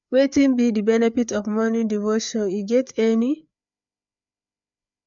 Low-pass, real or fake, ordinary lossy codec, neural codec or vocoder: 7.2 kHz; fake; MP3, 64 kbps; codec, 16 kHz, 4 kbps, FreqCodec, larger model